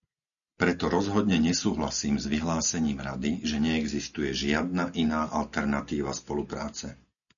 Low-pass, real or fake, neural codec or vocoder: 7.2 kHz; real; none